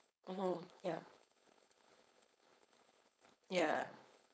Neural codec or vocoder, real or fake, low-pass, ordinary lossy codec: codec, 16 kHz, 4.8 kbps, FACodec; fake; none; none